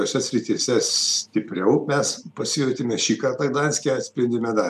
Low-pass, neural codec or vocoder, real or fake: 14.4 kHz; none; real